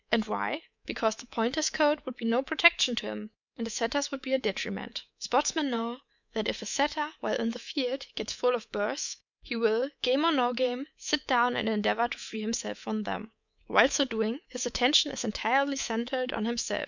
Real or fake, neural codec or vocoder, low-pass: fake; codec, 24 kHz, 3.1 kbps, DualCodec; 7.2 kHz